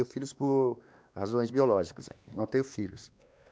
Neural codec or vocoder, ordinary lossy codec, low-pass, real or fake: codec, 16 kHz, 2 kbps, X-Codec, WavLM features, trained on Multilingual LibriSpeech; none; none; fake